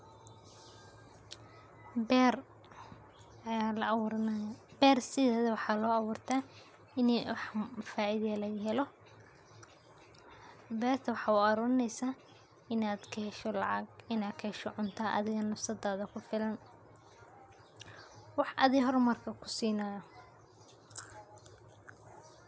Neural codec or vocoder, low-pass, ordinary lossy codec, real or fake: none; none; none; real